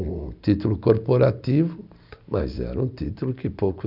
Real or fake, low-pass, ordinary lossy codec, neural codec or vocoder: real; 5.4 kHz; none; none